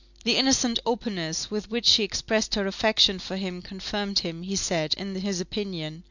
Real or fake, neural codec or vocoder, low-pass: real; none; 7.2 kHz